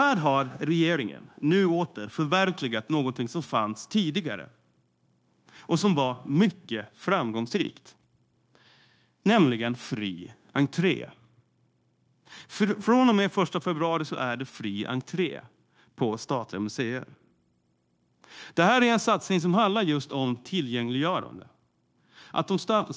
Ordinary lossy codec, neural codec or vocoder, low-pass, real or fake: none; codec, 16 kHz, 0.9 kbps, LongCat-Audio-Codec; none; fake